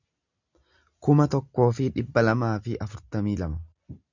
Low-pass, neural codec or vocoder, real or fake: 7.2 kHz; none; real